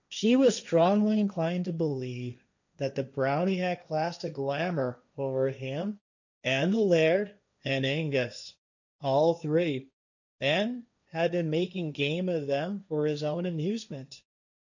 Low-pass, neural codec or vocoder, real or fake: 7.2 kHz; codec, 16 kHz, 1.1 kbps, Voila-Tokenizer; fake